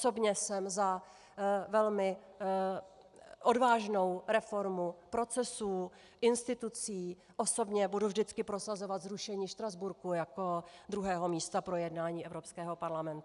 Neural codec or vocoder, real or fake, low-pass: none; real; 10.8 kHz